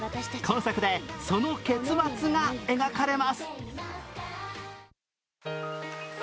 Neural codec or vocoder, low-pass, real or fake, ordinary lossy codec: none; none; real; none